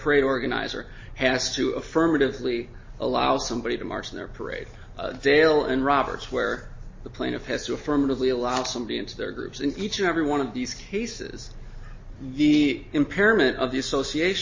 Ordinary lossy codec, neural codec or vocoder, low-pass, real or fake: MP3, 32 kbps; none; 7.2 kHz; real